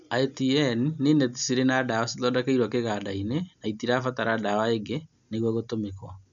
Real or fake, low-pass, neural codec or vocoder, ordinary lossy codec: real; 7.2 kHz; none; none